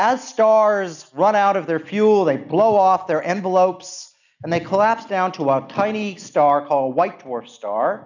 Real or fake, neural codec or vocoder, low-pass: real; none; 7.2 kHz